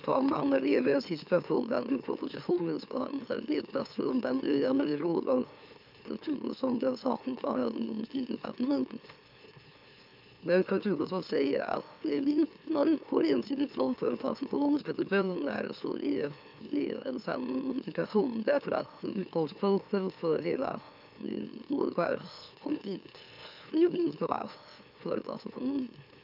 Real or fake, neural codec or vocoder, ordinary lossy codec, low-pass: fake; autoencoder, 44.1 kHz, a latent of 192 numbers a frame, MeloTTS; none; 5.4 kHz